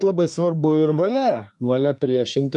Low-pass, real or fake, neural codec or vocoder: 10.8 kHz; fake; codec, 24 kHz, 1 kbps, SNAC